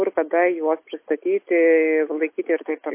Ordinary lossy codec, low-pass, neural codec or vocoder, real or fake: MP3, 24 kbps; 3.6 kHz; none; real